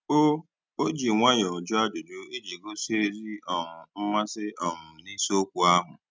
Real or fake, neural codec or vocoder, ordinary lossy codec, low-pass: real; none; none; none